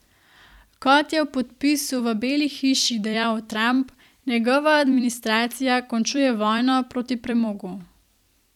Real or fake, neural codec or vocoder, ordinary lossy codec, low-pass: fake; vocoder, 44.1 kHz, 128 mel bands every 256 samples, BigVGAN v2; none; 19.8 kHz